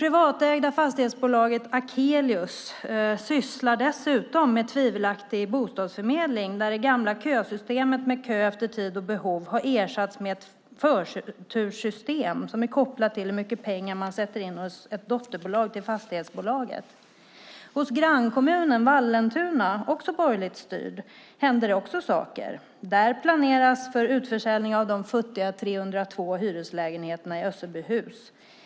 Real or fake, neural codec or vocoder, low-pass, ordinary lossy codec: real; none; none; none